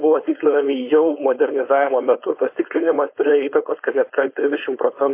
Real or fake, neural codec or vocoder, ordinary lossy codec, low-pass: fake; codec, 16 kHz, 4.8 kbps, FACodec; MP3, 24 kbps; 3.6 kHz